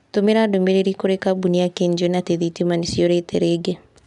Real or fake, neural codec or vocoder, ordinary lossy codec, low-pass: real; none; none; 10.8 kHz